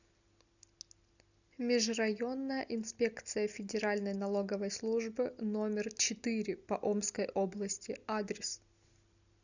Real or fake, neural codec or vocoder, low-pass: real; none; 7.2 kHz